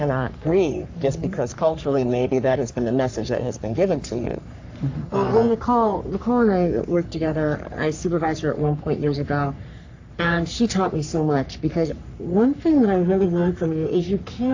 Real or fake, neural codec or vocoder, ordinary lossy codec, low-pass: fake; codec, 44.1 kHz, 3.4 kbps, Pupu-Codec; AAC, 48 kbps; 7.2 kHz